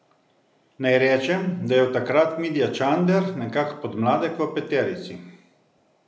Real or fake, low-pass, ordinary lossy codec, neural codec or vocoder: real; none; none; none